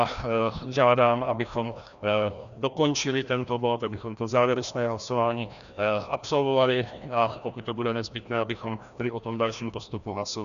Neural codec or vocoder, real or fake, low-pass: codec, 16 kHz, 1 kbps, FreqCodec, larger model; fake; 7.2 kHz